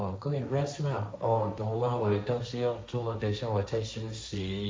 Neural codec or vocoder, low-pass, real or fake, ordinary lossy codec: codec, 16 kHz, 1.1 kbps, Voila-Tokenizer; none; fake; none